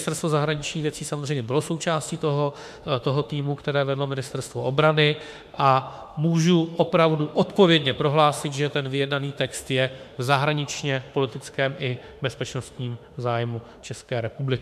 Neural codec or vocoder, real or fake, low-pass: autoencoder, 48 kHz, 32 numbers a frame, DAC-VAE, trained on Japanese speech; fake; 14.4 kHz